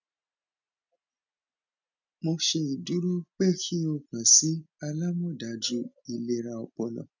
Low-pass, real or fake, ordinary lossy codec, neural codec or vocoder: 7.2 kHz; real; none; none